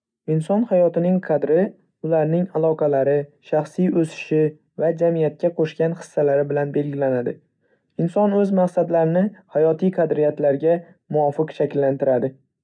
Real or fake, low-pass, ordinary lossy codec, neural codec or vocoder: real; 9.9 kHz; MP3, 96 kbps; none